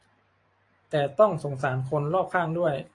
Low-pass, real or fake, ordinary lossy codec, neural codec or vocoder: 10.8 kHz; fake; AAC, 48 kbps; vocoder, 44.1 kHz, 128 mel bands every 512 samples, BigVGAN v2